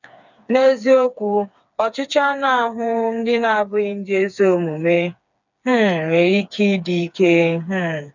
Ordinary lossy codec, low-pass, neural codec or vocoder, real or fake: none; 7.2 kHz; codec, 16 kHz, 4 kbps, FreqCodec, smaller model; fake